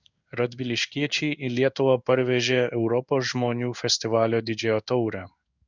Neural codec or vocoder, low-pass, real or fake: codec, 16 kHz in and 24 kHz out, 1 kbps, XY-Tokenizer; 7.2 kHz; fake